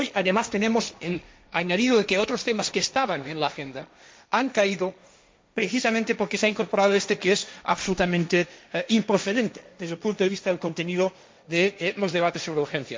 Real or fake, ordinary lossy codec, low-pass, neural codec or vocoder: fake; none; none; codec, 16 kHz, 1.1 kbps, Voila-Tokenizer